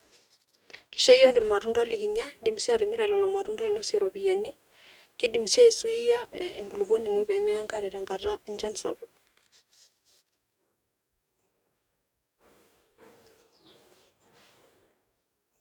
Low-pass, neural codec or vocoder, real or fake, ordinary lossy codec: 19.8 kHz; codec, 44.1 kHz, 2.6 kbps, DAC; fake; none